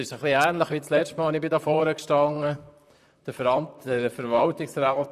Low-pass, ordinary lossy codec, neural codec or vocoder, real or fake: 14.4 kHz; none; vocoder, 44.1 kHz, 128 mel bands, Pupu-Vocoder; fake